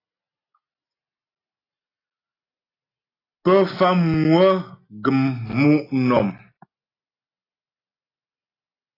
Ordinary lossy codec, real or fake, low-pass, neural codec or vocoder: AAC, 24 kbps; real; 5.4 kHz; none